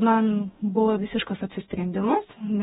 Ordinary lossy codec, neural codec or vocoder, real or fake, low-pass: AAC, 16 kbps; codec, 44.1 kHz, 2.6 kbps, DAC; fake; 19.8 kHz